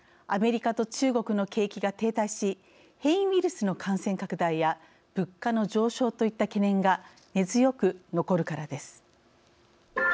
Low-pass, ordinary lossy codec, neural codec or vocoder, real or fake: none; none; none; real